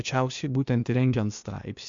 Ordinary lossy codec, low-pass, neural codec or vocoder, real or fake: MP3, 64 kbps; 7.2 kHz; codec, 16 kHz, 0.8 kbps, ZipCodec; fake